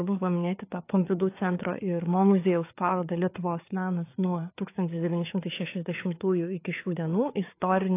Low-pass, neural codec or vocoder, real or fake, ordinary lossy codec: 3.6 kHz; codec, 16 kHz, 4 kbps, FreqCodec, larger model; fake; AAC, 24 kbps